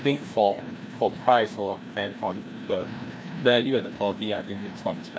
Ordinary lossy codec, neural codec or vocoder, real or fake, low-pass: none; codec, 16 kHz, 1 kbps, FreqCodec, larger model; fake; none